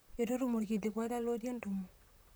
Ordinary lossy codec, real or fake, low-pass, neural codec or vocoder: none; fake; none; vocoder, 44.1 kHz, 128 mel bands, Pupu-Vocoder